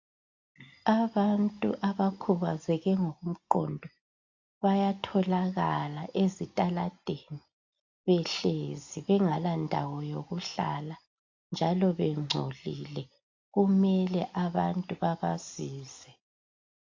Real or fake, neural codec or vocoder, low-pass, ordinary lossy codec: real; none; 7.2 kHz; AAC, 48 kbps